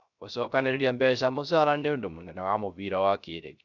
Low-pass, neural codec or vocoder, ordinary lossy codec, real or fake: 7.2 kHz; codec, 16 kHz, 0.3 kbps, FocalCodec; none; fake